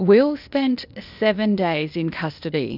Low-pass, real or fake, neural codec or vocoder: 5.4 kHz; fake; codec, 16 kHz, 0.8 kbps, ZipCodec